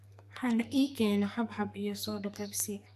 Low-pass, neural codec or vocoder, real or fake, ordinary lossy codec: 14.4 kHz; codec, 44.1 kHz, 2.6 kbps, SNAC; fake; AAC, 96 kbps